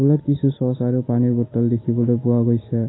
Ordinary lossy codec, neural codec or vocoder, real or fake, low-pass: AAC, 16 kbps; none; real; 7.2 kHz